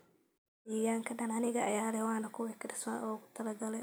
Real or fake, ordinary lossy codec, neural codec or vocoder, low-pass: real; none; none; none